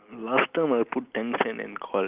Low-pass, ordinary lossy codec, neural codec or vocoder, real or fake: 3.6 kHz; Opus, 32 kbps; none; real